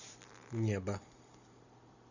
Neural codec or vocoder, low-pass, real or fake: vocoder, 44.1 kHz, 128 mel bands every 512 samples, BigVGAN v2; 7.2 kHz; fake